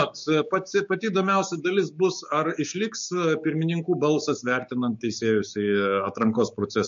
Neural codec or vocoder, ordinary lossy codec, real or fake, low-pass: codec, 16 kHz, 6 kbps, DAC; MP3, 48 kbps; fake; 7.2 kHz